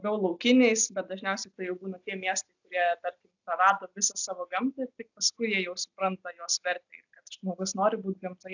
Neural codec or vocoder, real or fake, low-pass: none; real; 7.2 kHz